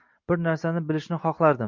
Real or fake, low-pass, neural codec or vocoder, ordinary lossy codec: real; 7.2 kHz; none; MP3, 64 kbps